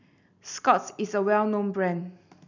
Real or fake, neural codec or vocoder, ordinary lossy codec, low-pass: real; none; none; 7.2 kHz